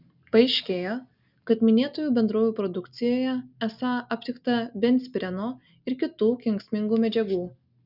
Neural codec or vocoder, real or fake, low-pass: none; real; 5.4 kHz